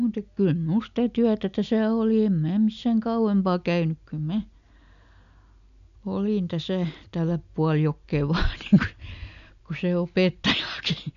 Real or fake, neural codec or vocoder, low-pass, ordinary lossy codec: real; none; 7.2 kHz; none